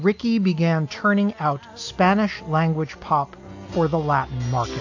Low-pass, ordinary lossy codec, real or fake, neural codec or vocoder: 7.2 kHz; AAC, 48 kbps; real; none